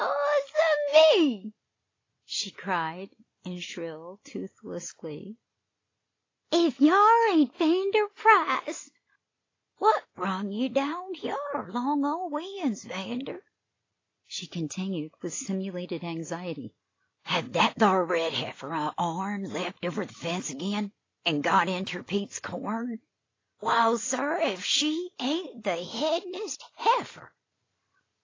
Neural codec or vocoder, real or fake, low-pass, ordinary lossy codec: none; real; 7.2 kHz; AAC, 32 kbps